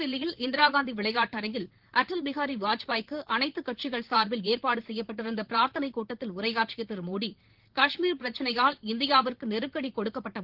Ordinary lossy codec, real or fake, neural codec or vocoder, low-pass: Opus, 16 kbps; fake; vocoder, 44.1 kHz, 128 mel bands, Pupu-Vocoder; 5.4 kHz